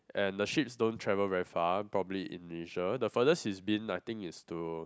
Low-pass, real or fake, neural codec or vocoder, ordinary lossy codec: none; real; none; none